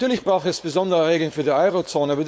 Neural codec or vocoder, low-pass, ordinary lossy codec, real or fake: codec, 16 kHz, 4.8 kbps, FACodec; none; none; fake